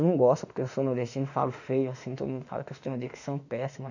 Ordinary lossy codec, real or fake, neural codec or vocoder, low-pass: none; fake; autoencoder, 48 kHz, 32 numbers a frame, DAC-VAE, trained on Japanese speech; 7.2 kHz